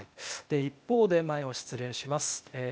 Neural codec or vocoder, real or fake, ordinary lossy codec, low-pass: codec, 16 kHz, 0.8 kbps, ZipCodec; fake; none; none